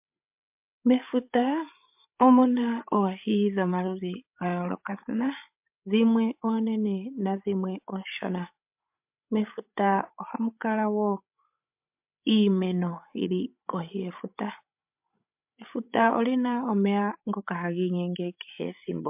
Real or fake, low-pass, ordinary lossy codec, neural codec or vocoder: fake; 3.6 kHz; MP3, 32 kbps; codec, 16 kHz, 16 kbps, FreqCodec, larger model